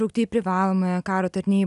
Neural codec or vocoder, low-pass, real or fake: none; 10.8 kHz; real